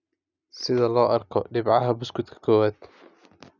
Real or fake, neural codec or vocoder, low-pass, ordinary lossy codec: real; none; 7.2 kHz; none